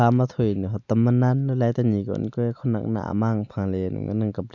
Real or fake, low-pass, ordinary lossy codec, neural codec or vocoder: real; 7.2 kHz; none; none